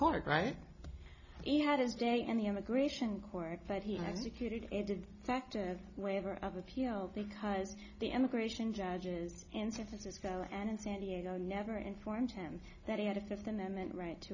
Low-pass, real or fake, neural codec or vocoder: 7.2 kHz; real; none